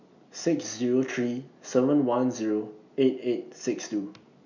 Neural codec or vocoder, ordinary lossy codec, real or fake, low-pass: none; none; real; 7.2 kHz